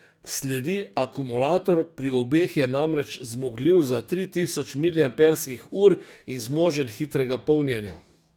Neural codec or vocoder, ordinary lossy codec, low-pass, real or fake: codec, 44.1 kHz, 2.6 kbps, DAC; none; 19.8 kHz; fake